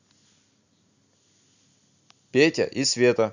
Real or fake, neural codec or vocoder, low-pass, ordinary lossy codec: real; none; 7.2 kHz; none